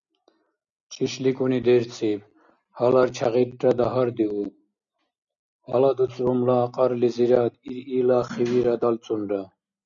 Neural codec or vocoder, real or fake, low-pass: none; real; 7.2 kHz